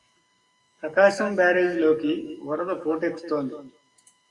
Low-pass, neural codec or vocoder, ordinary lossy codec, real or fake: 10.8 kHz; codec, 44.1 kHz, 7.8 kbps, DAC; Opus, 64 kbps; fake